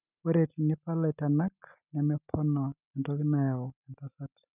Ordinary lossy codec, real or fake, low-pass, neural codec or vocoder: none; real; 3.6 kHz; none